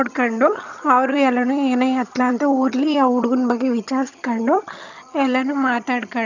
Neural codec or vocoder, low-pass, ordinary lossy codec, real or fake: vocoder, 22.05 kHz, 80 mel bands, HiFi-GAN; 7.2 kHz; none; fake